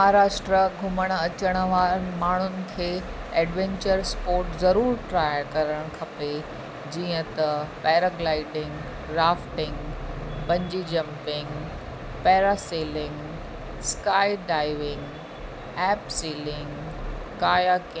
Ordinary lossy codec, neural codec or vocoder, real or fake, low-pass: none; none; real; none